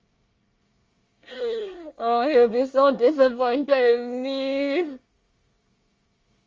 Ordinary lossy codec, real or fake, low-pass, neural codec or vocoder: Opus, 32 kbps; fake; 7.2 kHz; codec, 24 kHz, 1 kbps, SNAC